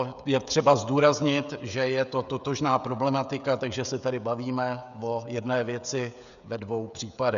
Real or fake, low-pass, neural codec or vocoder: fake; 7.2 kHz; codec, 16 kHz, 16 kbps, FreqCodec, smaller model